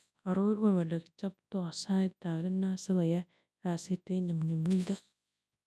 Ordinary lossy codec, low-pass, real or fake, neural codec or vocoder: none; none; fake; codec, 24 kHz, 0.9 kbps, WavTokenizer, large speech release